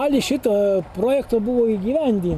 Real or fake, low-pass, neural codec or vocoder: real; 14.4 kHz; none